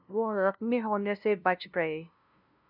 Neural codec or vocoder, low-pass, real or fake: codec, 16 kHz, 0.5 kbps, FunCodec, trained on LibriTTS, 25 frames a second; 5.4 kHz; fake